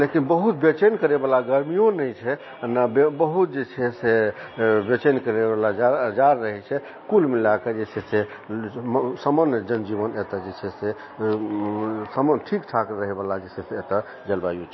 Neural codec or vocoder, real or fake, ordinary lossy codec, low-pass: none; real; MP3, 24 kbps; 7.2 kHz